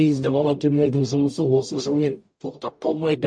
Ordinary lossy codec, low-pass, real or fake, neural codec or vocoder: MP3, 48 kbps; 9.9 kHz; fake; codec, 44.1 kHz, 0.9 kbps, DAC